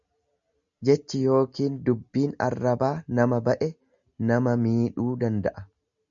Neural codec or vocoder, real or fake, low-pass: none; real; 7.2 kHz